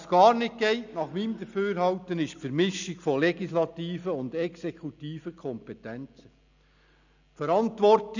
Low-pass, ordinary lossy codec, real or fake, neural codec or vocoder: 7.2 kHz; none; real; none